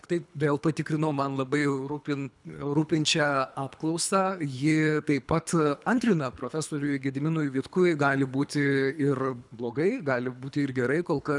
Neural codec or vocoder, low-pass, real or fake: codec, 24 kHz, 3 kbps, HILCodec; 10.8 kHz; fake